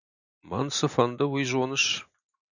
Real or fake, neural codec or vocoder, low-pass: real; none; 7.2 kHz